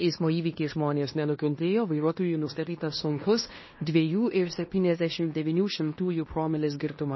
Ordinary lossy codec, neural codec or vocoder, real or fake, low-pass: MP3, 24 kbps; codec, 16 kHz in and 24 kHz out, 0.9 kbps, LongCat-Audio-Codec, fine tuned four codebook decoder; fake; 7.2 kHz